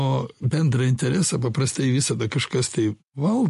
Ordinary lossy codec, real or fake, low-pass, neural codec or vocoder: MP3, 48 kbps; real; 14.4 kHz; none